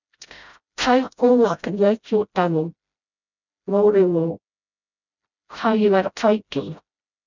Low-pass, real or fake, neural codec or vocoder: 7.2 kHz; fake; codec, 16 kHz, 0.5 kbps, FreqCodec, smaller model